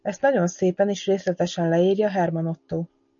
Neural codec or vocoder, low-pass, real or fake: none; 7.2 kHz; real